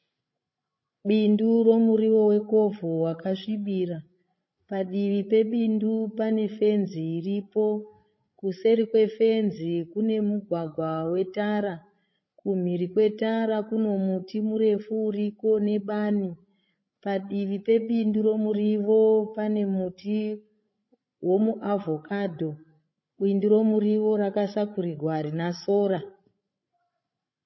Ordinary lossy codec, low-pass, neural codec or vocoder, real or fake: MP3, 24 kbps; 7.2 kHz; codec, 16 kHz, 16 kbps, FreqCodec, larger model; fake